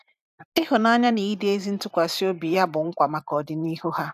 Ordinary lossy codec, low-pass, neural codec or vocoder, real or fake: none; 14.4 kHz; vocoder, 44.1 kHz, 128 mel bands every 512 samples, BigVGAN v2; fake